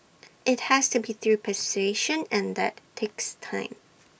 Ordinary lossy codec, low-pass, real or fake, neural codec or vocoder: none; none; real; none